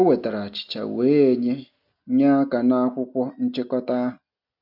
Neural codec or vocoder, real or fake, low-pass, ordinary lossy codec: none; real; 5.4 kHz; none